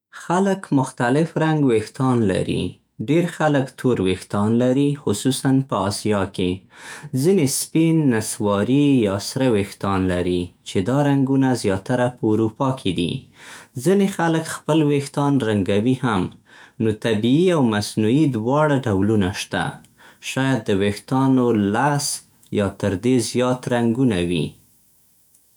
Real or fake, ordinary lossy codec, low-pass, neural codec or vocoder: fake; none; none; autoencoder, 48 kHz, 128 numbers a frame, DAC-VAE, trained on Japanese speech